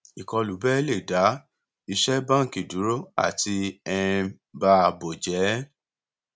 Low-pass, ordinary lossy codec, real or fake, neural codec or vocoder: none; none; real; none